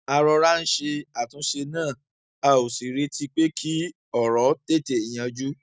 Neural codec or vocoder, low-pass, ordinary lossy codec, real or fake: none; none; none; real